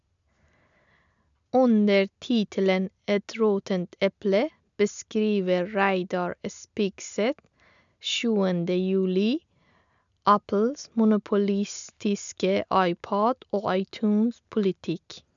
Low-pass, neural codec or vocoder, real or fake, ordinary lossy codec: 7.2 kHz; none; real; none